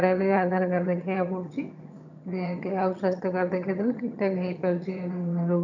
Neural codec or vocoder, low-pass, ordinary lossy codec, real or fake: vocoder, 22.05 kHz, 80 mel bands, HiFi-GAN; 7.2 kHz; none; fake